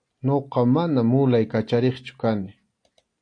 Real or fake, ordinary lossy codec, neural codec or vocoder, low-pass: real; AAC, 48 kbps; none; 9.9 kHz